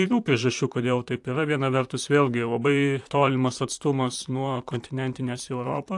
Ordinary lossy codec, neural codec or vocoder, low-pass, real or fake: AAC, 64 kbps; vocoder, 44.1 kHz, 128 mel bands, Pupu-Vocoder; 10.8 kHz; fake